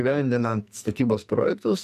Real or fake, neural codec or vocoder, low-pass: fake; codec, 44.1 kHz, 2.6 kbps, SNAC; 14.4 kHz